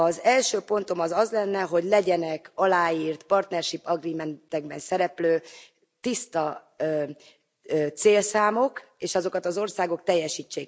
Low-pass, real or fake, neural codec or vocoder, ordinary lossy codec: none; real; none; none